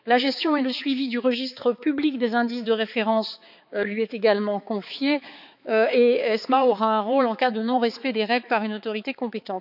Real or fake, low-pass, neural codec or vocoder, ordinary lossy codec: fake; 5.4 kHz; codec, 16 kHz, 4 kbps, X-Codec, HuBERT features, trained on balanced general audio; none